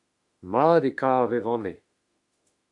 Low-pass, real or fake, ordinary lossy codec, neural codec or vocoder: 10.8 kHz; fake; AAC, 64 kbps; autoencoder, 48 kHz, 32 numbers a frame, DAC-VAE, trained on Japanese speech